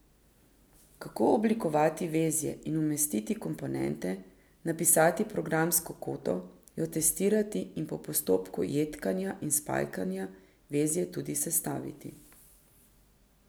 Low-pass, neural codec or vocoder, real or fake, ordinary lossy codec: none; none; real; none